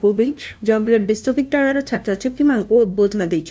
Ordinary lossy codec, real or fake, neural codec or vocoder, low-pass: none; fake; codec, 16 kHz, 0.5 kbps, FunCodec, trained on LibriTTS, 25 frames a second; none